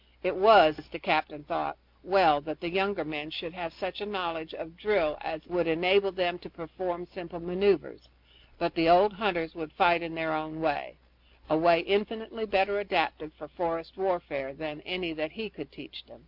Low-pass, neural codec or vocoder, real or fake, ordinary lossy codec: 5.4 kHz; none; real; MP3, 48 kbps